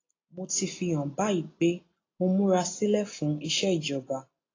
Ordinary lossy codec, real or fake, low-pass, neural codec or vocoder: AAC, 32 kbps; real; 7.2 kHz; none